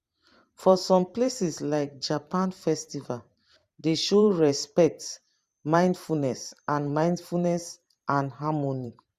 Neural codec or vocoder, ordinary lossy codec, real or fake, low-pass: vocoder, 44.1 kHz, 128 mel bands every 512 samples, BigVGAN v2; Opus, 64 kbps; fake; 14.4 kHz